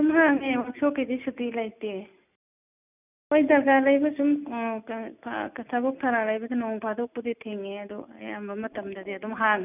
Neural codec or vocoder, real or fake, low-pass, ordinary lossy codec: none; real; 3.6 kHz; none